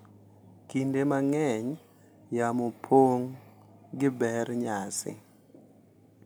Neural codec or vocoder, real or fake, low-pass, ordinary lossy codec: none; real; none; none